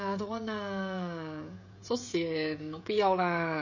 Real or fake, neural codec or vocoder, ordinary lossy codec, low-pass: fake; codec, 16 kHz, 16 kbps, FreqCodec, smaller model; none; 7.2 kHz